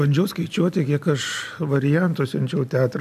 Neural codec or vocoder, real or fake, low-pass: none; real; 14.4 kHz